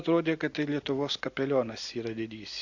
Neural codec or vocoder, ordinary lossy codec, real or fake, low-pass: none; AAC, 48 kbps; real; 7.2 kHz